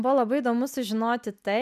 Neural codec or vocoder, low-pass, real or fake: none; 14.4 kHz; real